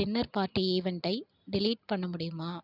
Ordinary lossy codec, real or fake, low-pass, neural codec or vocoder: none; real; 5.4 kHz; none